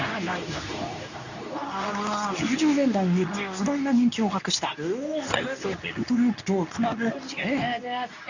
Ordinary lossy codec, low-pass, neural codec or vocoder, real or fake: none; 7.2 kHz; codec, 24 kHz, 0.9 kbps, WavTokenizer, medium speech release version 2; fake